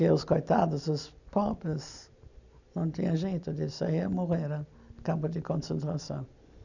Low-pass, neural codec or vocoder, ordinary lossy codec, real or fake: 7.2 kHz; none; none; real